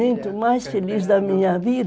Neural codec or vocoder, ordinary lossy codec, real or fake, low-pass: none; none; real; none